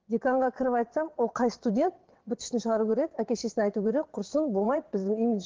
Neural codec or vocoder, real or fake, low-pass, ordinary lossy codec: vocoder, 22.05 kHz, 80 mel bands, WaveNeXt; fake; 7.2 kHz; Opus, 16 kbps